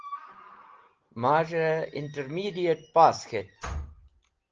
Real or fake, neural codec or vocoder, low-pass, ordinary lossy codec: real; none; 7.2 kHz; Opus, 16 kbps